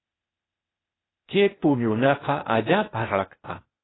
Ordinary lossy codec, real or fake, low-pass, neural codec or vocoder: AAC, 16 kbps; fake; 7.2 kHz; codec, 16 kHz, 0.8 kbps, ZipCodec